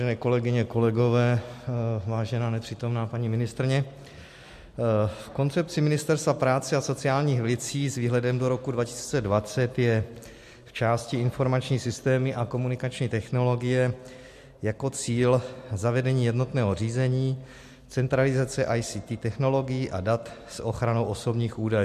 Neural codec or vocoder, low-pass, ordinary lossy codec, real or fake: none; 14.4 kHz; MP3, 64 kbps; real